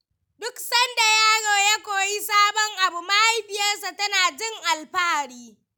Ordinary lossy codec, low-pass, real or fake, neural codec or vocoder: none; none; real; none